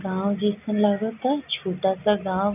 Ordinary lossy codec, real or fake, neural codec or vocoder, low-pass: none; real; none; 3.6 kHz